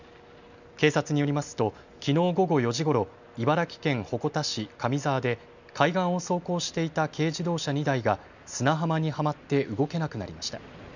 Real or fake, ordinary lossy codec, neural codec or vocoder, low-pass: real; none; none; 7.2 kHz